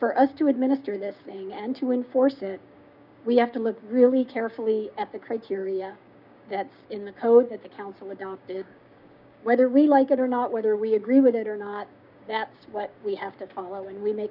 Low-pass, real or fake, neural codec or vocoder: 5.4 kHz; fake; codec, 16 kHz, 6 kbps, DAC